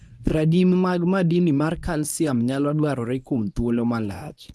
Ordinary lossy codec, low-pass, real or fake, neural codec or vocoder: none; none; fake; codec, 24 kHz, 0.9 kbps, WavTokenizer, medium speech release version 1